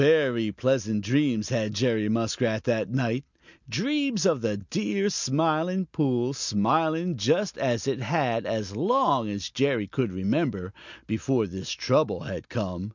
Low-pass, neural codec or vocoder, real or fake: 7.2 kHz; none; real